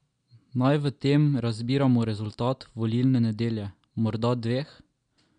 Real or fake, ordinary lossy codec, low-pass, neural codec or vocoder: real; MP3, 64 kbps; 9.9 kHz; none